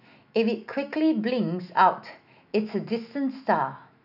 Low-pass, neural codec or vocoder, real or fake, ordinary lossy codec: 5.4 kHz; autoencoder, 48 kHz, 128 numbers a frame, DAC-VAE, trained on Japanese speech; fake; none